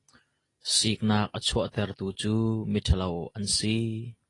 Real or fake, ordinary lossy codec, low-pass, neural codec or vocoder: real; AAC, 32 kbps; 10.8 kHz; none